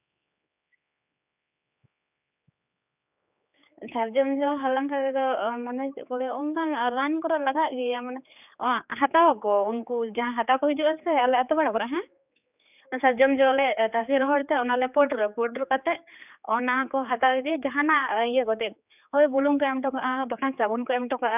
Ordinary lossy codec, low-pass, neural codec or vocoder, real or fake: none; 3.6 kHz; codec, 16 kHz, 4 kbps, X-Codec, HuBERT features, trained on general audio; fake